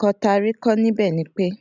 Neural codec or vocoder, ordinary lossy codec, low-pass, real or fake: none; none; 7.2 kHz; real